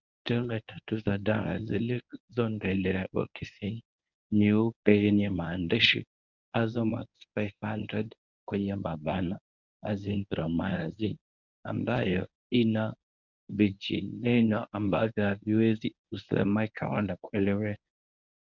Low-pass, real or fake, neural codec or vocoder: 7.2 kHz; fake; codec, 24 kHz, 0.9 kbps, WavTokenizer, medium speech release version 1